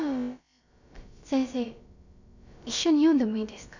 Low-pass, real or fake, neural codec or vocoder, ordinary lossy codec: 7.2 kHz; fake; codec, 16 kHz, about 1 kbps, DyCAST, with the encoder's durations; none